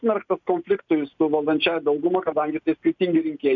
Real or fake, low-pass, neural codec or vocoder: real; 7.2 kHz; none